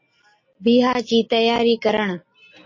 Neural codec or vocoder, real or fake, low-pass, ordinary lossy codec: none; real; 7.2 kHz; MP3, 32 kbps